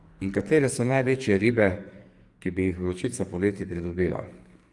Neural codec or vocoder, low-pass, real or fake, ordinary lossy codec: codec, 44.1 kHz, 2.6 kbps, SNAC; 10.8 kHz; fake; Opus, 32 kbps